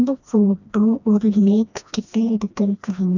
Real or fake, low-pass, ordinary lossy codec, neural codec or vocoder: fake; 7.2 kHz; none; codec, 16 kHz, 1 kbps, FreqCodec, smaller model